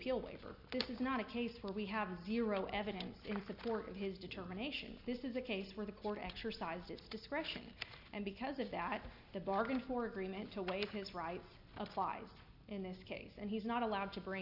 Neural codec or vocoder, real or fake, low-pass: none; real; 5.4 kHz